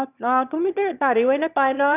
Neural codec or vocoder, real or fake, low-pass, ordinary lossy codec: autoencoder, 22.05 kHz, a latent of 192 numbers a frame, VITS, trained on one speaker; fake; 3.6 kHz; none